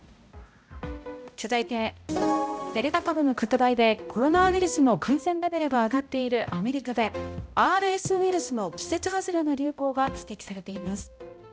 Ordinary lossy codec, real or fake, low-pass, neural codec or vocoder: none; fake; none; codec, 16 kHz, 0.5 kbps, X-Codec, HuBERT features, trained on balanced general audio